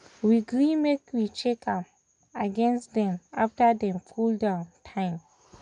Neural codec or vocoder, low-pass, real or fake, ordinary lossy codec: none; 9.9 kHz; real; none